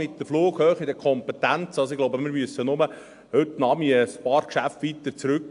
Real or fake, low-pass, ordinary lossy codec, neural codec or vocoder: real; 10.8 kHz; AAC, 64 kbps; none